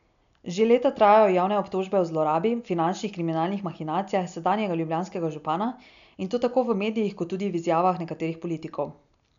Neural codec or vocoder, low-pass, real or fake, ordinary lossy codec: none; 7.2 kHz; real; none